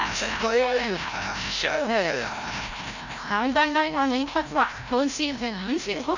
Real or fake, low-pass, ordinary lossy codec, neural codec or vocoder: fake; 7.2 kHz; none; codec, 16 kHz, 0.5 kbps, FreqCodec, larger model